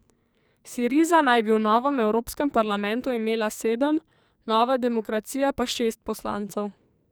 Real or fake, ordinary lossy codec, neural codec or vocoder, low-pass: fake; none; codec, 44.1 kHz, 2.6 kbps, SNAC; none